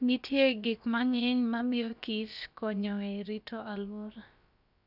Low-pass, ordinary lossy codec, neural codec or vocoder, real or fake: 5.4 kHz; none; codec, 16 kHz, about 1 kbps, DyCAST, with the encoder's durations; fake